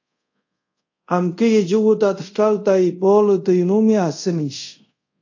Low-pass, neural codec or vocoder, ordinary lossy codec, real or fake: 7.2 kHz; codec, 24 kHz, 0.5 kbps, DualCodec; AAC, 48 kbps; fake